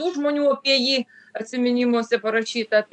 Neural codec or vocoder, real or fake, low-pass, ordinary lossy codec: none; real; 10.8 kHz; MP3, 64 kbps